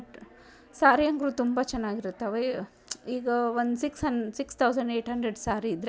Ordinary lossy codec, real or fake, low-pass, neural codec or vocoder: none; real; none; none